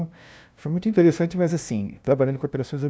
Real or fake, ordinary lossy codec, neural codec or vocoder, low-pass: fake; none; codec, 16 kHz, 0.5 kbps, FunCodec, trained on LibriTTS, 25 frames a second; none